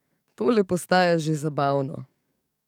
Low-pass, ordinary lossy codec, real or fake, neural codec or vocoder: 19.8 kHz; none; fake; codec, 44.1 kHz, 7.8 kbps, DAC